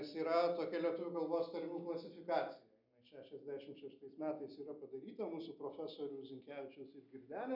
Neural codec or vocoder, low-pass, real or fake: none; 5.4 kHz; real